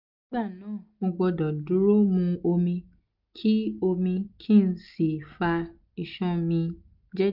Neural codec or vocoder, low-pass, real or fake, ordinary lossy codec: none; 5.4 kHz; real; none